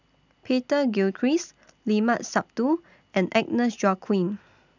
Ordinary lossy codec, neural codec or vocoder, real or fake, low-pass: none; none; real; 7.2 kHz